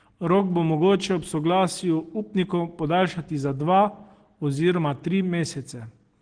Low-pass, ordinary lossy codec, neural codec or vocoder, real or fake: 9.9 kHz; Opus, 16 kbps; none; real